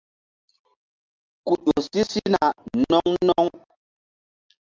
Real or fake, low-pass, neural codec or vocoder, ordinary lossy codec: real; 7.2 kHz; none; Opus, 24 kbps